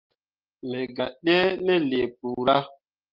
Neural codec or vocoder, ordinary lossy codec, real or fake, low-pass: none; Opus, 24 kbps; real; 5.4 kHz